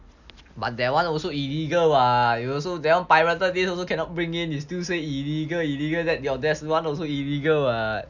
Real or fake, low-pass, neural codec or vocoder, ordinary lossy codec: real; 7.2 kHz; none; none